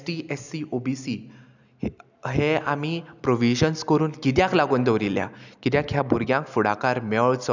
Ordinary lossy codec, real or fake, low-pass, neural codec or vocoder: none; real; 7.2 kHz; none